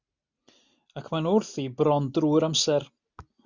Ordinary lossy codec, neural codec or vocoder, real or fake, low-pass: Opus, 64 kbps; none; real; 7.2 kHz